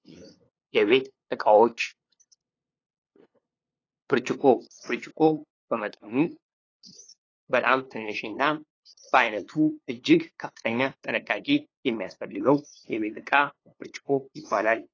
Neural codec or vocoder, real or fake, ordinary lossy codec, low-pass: codec, 16 kHz, 2 kbps, FunCodec, trained on LibriTTS, 25 frames a second; fake; AAC, 32 kbps; 7.2 kHz